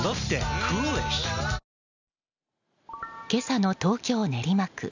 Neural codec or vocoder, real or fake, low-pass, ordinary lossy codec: none; real; 7.2 kHz; none